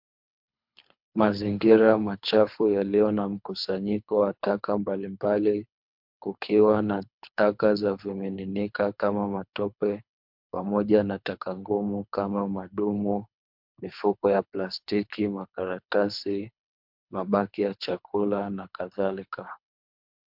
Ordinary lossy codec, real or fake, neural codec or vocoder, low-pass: MP3, 48 kbps; fake; codec, 24 kHz, 3 kbps, HILCodec; 5.4 kHz